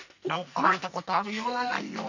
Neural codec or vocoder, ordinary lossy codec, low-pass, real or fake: codec, 44.1 kHz, 2.6 kbps, SNAC; none; 7.2 kHz; fake